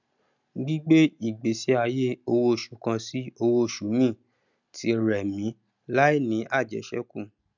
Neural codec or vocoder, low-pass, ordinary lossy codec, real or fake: none; 7.2 kHz; none; real